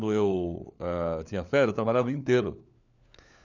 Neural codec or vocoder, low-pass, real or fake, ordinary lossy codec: codec, 16 kHz, 4 kbps, FunCodec, trained on LibriTTS, 50 frames a second; 7.2 kHz; fake; none